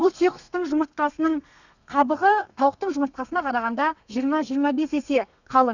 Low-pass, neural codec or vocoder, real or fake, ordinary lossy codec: 7.2 kHz; codec, 32 kHz, 1.9 kbps, SNAC; fake; none